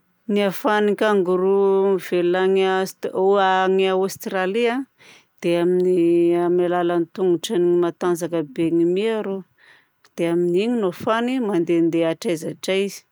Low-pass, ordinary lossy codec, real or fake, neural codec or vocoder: none; none; real; none